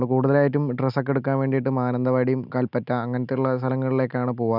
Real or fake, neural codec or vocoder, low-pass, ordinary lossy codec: real; none; 5.4 kHz; none